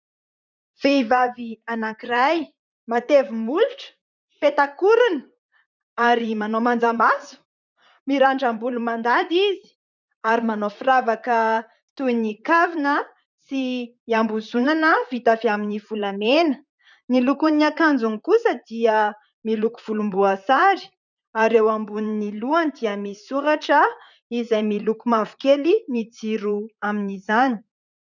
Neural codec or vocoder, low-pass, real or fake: vocoder, 44.1 kHz, 128 mel bands, Pupu-Vocoder; 7.2 kHz; fake